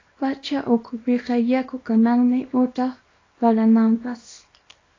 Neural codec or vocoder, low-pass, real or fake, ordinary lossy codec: codec, 24 kHz, 0.9 kbps, WavTokenizer, small release; 7.2 kHz; fake; AAC, 32 kbps